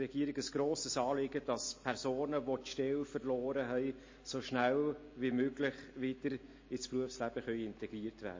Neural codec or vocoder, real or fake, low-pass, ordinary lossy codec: none; real; 7.2 kHz; MP3, 32 kbps